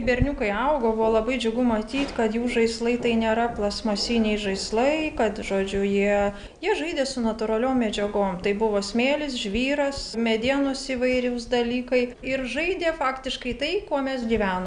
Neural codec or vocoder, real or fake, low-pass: none; real; 9.9 kHz